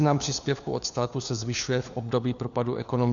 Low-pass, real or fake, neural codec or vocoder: 7.2 kHz; fake; codec, 16 kHz, 4 kbps, FunCodec, trained on LibriTTS, 50 frames a second